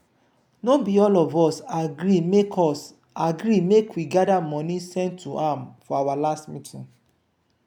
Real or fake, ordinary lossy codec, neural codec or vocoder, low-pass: real; none; none; 19.8 kHz